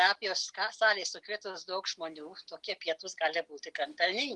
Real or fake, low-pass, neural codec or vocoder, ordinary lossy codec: real; 10.8 kHz; none; Opus, 24 kbps